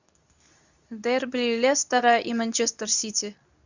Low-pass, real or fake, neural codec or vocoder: 7.2 kHz; fake; codec, 24 kHz, 0.9 kbps, WavTokenizer, medium speech release version 2